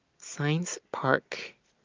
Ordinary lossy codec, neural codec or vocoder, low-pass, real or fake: Opus, 24 kbps; none; 7.2 kHz; real